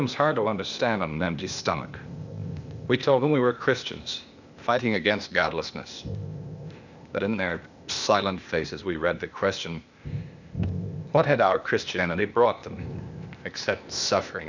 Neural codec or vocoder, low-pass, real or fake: codec, 16 kHz, 0.8 kbps, ZipCodec; 7.2 kHz; fake